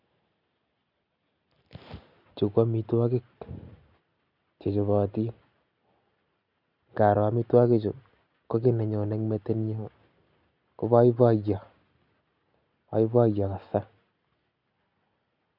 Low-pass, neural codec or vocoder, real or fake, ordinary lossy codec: 5.4 kHz; none; real; none